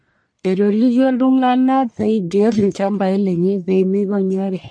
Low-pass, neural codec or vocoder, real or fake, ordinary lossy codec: 9.9 kHz; codec, 44.1 kHz, 1.7 kbps, Pupu-Codec; fake; MP3, 48 kbps